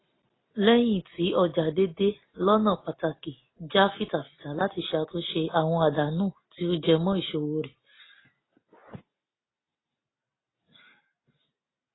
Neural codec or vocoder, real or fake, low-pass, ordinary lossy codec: none; real; 7.2 kHz; AAC, 16 kbps